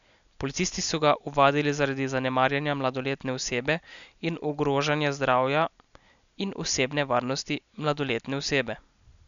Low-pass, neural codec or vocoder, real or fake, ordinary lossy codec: 7.2 kHz; none; real; none